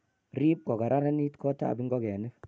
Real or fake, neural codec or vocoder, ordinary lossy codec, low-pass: real; none; none; none